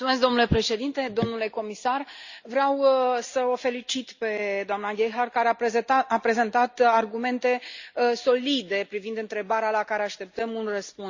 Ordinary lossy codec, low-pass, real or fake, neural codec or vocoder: Opus, 64 kbps; 7.2 kHz; real; none